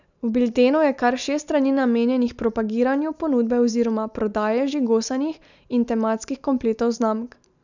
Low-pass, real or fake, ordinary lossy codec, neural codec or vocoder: 7.2 kHz; real; none; none